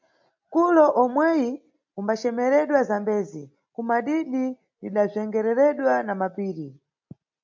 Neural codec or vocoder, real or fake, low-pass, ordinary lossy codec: none; real; 7.2 kHz; MP3, 64 kbps